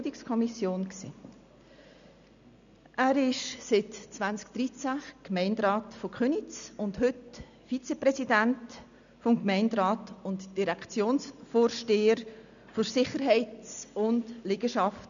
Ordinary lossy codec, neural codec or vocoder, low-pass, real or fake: none; none; 7.2 kHz; real